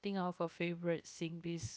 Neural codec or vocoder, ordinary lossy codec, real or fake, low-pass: codec, 16 kHz, 0.7 kbps, FocalCodec; none; fake; none